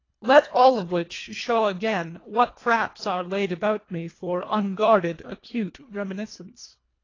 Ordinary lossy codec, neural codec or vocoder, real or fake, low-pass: AAC, 32 kbps; codec, 24 kHz, 1.5 kbps, HILCodec; fake; 7.2 kHz